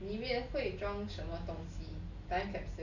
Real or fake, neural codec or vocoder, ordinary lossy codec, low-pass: real; none; none; 7.2 kHz